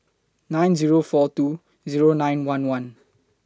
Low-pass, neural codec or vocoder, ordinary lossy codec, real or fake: none; none; none; real